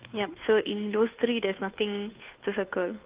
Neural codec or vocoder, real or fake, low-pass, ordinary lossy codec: codec, 16 kHz, 2 kbps, FunCodec, trained on Chinese and English, 25 frames a second; fake; 3.6 kHz; Opus, 32 kbps